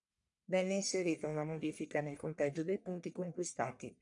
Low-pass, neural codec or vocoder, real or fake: 10.8 kHz; codec, 44.1 kHz, 1.7 kbps, Pupu-Codec; fake